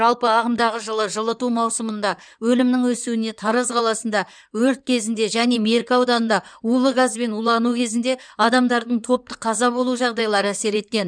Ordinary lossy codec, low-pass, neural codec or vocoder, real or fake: none; 9.9 kHz; codec, 16 kHz in and 24 kHz out, 2.2 kbps, FireRedTTS-2 codec; fake